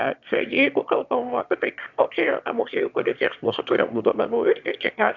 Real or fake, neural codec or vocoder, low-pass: fake; autoencoder, 22.05 kHz, a latent of 192 numbers a frame, VITS, trained on one speaker; 7.2 kHz